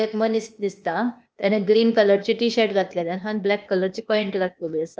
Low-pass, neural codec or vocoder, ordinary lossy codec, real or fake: none; codec, 16 kHz, 0.8 kbps, ZipCodec; none; fake